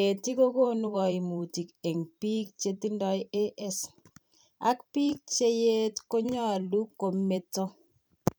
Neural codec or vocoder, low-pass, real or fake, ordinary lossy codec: vocoder, 44.1 kHz, 128 mel bands every 512 samples, BigVGAN v2; none; fake; none